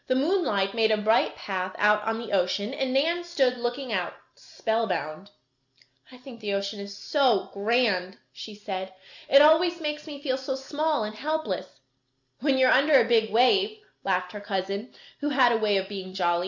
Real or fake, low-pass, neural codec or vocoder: real; 7.2 kHz; none